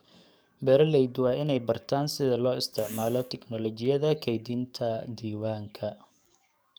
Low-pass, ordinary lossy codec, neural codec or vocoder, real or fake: none; none; codec, 44.1 kHz, 7.8 kbps, DAC; fake